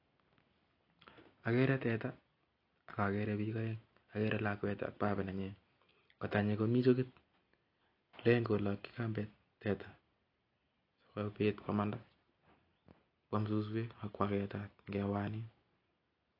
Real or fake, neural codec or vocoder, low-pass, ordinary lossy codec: real; none; 5.4 kHz; AAC, 32 kbps